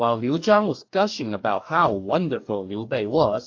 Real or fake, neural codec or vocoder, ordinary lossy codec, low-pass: fake; codec, 44.1 kHz, 2.6 kbps, DAC; AAC, 48 kbps; 7.2 kHz